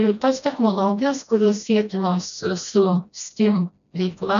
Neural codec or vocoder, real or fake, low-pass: codec, 16 kHz, 1 kbps, FreqCodec, smaller model; fake; 7.2 kHz